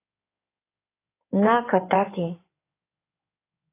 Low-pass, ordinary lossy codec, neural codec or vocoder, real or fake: 3.6 kHz; AAC, 24 kbps; codec, 16 kHz in and 24 kHz out, 2.2 kbps, FireRedTTS-2 codec; fake